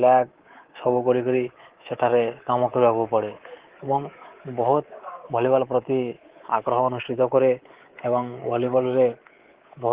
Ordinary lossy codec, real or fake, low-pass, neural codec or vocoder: Opus, 16 kbps; fake; 3.6 kHz; codec, 24 kHz, 3.1 kbps, DualCodec